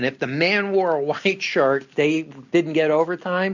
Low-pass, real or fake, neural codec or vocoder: 7.2 kHz; real; none